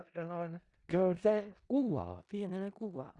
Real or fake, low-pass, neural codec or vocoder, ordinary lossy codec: fake; 10.8 kHz; codec, 16 kHz in and 24 kHz out, 0.4 kbps, LongCat-Audio-Codec, four codebook decoder; Opus, 64 kbps